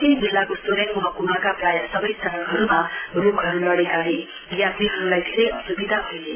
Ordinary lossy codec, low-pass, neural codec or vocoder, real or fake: AAC, 16 kbps; 3.6 kHz; none; real